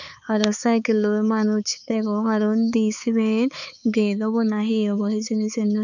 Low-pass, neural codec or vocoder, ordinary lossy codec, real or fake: 7.2 kHz; codec, 16 kHz, 4 kbps, X-Codec, HuBERT features, trained on balanced general audio; none; fake